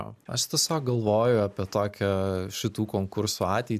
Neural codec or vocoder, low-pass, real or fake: none; 14.4 kHz; real